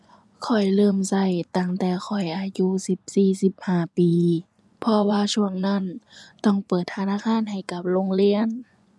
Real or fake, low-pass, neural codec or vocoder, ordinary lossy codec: fake; none; vocoder, 24 kHz, 100 mel bands, Vocos; none